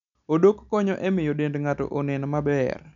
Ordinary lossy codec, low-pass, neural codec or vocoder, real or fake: none; 7.2 kHz; none; real